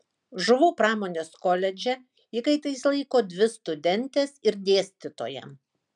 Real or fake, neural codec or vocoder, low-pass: real; none; 10.8 kHz